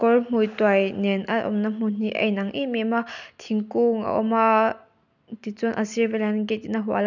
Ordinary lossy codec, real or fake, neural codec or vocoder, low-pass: none; real; none; 7.2 kHz